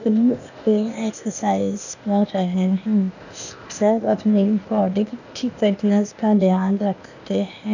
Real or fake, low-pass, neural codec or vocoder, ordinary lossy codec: fake; 7.2 kHz; codec, 16 kHz, 0.8 kbps, ZipCodec; none